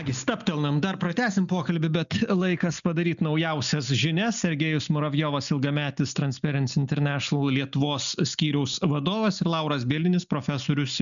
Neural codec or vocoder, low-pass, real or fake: none; 7.2 kHz; real